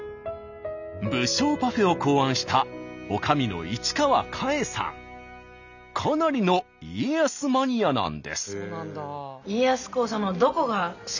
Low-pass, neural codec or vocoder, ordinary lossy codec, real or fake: 7.2 kHz; none; none; real